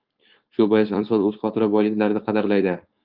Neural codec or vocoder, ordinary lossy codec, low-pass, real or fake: codec, 16 kHz, 4.8 kbps, FACodec; Opus, 24 kbps; 5.4 kHz; fake